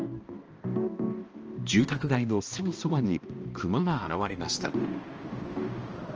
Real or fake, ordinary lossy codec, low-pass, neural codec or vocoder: fake; Opus, 24 kbps; 7.2 kHz; codec, 16 kHz, 1 kbps, X-Codec, HuBERT features, trained on balanced general audio